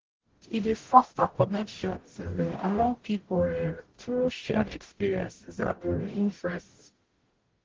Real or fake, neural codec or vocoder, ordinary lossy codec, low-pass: fake; codec, 44.1 kHz, 0.9 kbps, DAC; Opus, 16 kbps; 7.2 kHz